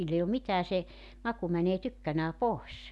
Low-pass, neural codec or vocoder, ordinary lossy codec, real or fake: none; none; none; real